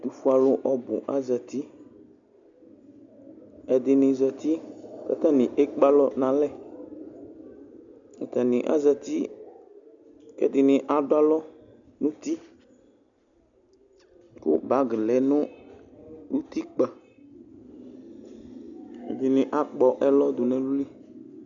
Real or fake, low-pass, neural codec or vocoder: real; 7.2 kHz; none